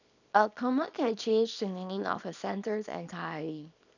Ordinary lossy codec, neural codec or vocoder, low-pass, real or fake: none; codec, 24 kHz, 0.9 kbps, WavTokenizer, small release; 7.2 kHz; fake